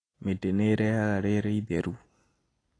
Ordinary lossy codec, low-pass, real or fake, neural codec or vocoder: AAC, 32 kbps; 9.9 kHz; real; none